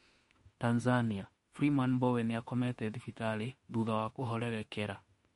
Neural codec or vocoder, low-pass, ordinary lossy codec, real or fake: autoencoder, 48 kHz, 32 numbers a frame, DAC-VAE, trained on Japanese speech; 19.8 kHz; MP3, 48 kbps; fake